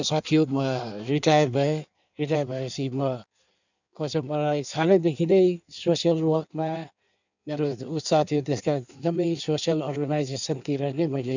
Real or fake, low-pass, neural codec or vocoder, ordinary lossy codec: fake; 7.2 kHz; codec, 16 kHz in and 24 kHz out, 1.1 kbps, FireRedTTS-2 codec; none